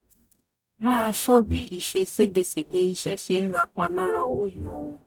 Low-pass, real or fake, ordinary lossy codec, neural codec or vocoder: 19.8 kHz; fake; none; codec, 44.1 kHz, 0.9 kbps, DAC